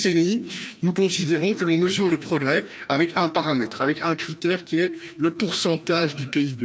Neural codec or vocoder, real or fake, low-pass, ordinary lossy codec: codec, 16 kHz, 1 kbps, FreqCodec, larger model; fake; none; none